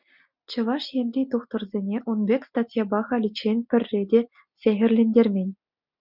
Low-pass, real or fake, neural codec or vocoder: 5.4 kHz; real; none